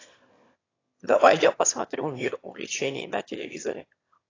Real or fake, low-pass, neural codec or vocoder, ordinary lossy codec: fake; 7.2 kHz; autoencoder, 22.05 kHz, a latent of 192 numbers a frame, VITS, trained on one speaker; AAC, 32 kbps